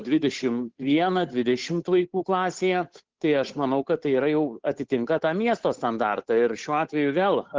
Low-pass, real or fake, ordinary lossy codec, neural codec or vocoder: 7.2 kHz; fake; Opus, 16 kbps; codec, 16 kHz, 8 kbps, FunCodec, trained on Chinese and English, 25 frames a second